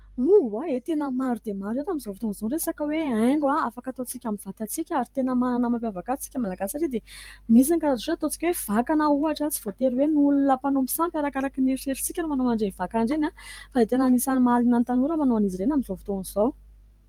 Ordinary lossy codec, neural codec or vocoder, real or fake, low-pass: Opus, 32 kbps; vocoder, 44.1 kHz, 128 mel bands every 512 samples, BigVGAN v2; fake; 19.8 kHz